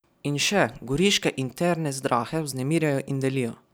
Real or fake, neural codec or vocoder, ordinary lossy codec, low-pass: real; none; none; none